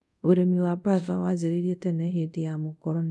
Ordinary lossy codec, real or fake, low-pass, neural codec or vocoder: none; fake; none; codec, 24 kHz, 0.5 kbps, DualCodec